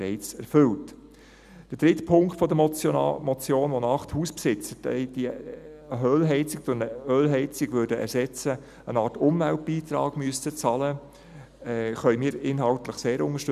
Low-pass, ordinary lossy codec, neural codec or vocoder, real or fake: 14.4 kHz; none; none; real